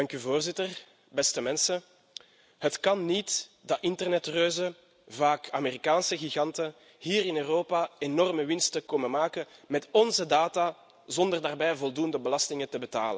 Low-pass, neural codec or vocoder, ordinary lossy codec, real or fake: none; none; none; real